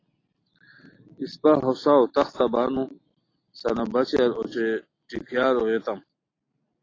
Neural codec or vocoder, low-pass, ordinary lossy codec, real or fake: none; 7.2 kHz; AAC, 32 kbps; real